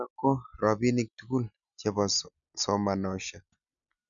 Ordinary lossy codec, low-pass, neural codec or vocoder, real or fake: MP3, 96 kbps; 7.2 kHz; none; real